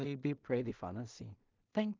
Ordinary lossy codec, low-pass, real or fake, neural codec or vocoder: Opus, 24 kbps; 7.2 kHz; fake; codec, 16 kHz in and 24 kHz out, 0.4 kbps, LongCat-Audio-Codec, two codebook decoder